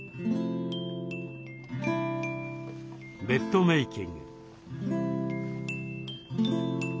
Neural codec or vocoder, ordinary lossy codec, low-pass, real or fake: none; none; none; real